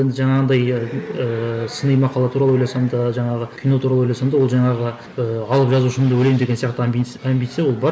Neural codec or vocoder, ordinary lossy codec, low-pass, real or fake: none; none; none; real